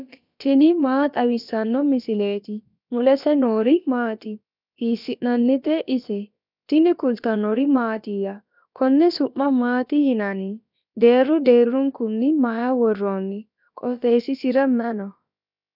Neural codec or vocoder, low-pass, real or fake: codec, 16 kHz, about 1 kbps, DyCAST, with the encoder's durations; 5.4 kHz; fake